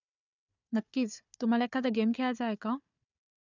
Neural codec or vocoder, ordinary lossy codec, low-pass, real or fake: codec, 16 kHz, 4 kbps, FunCodec, trained on Chinese and English, 50 frames a second; none; 7.2 kHz; fake